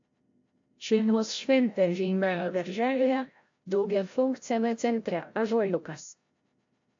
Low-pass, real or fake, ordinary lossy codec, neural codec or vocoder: 7.2 kHz; fake; AAC, 48 kbps; codec, 16 kHz, 0.5 kbps, FreqCodec, larger model